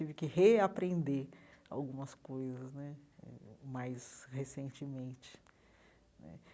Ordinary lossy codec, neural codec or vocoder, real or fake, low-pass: none; none; real; none